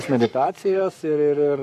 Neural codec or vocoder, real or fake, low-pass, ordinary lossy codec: codec, 44.1 kHz, 7.8 kbps, Pupu-Codec; fake; 14.4 kHz; MP3, 96 kbps